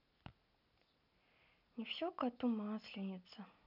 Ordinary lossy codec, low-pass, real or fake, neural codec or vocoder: none; 5.4 kHz; real; none